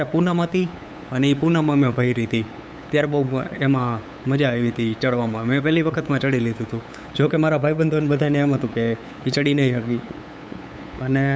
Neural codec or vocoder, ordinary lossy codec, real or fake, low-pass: codec, 16 kHz, 8 kbps, FunCodec, trained on LibriTTS, 25 frames a second; none; fake; none